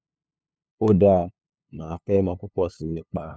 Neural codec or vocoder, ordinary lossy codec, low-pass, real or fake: codec, 16 kHz, 2 kbps, FunCodec, trained on LibriTTS, 25 frames a second; none; none; fake